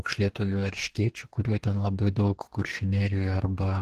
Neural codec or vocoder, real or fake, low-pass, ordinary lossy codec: codec, 44.1 kHz, 2.6 kbps, DAC; fake; 14.4 kHz; Opus, 16 kbps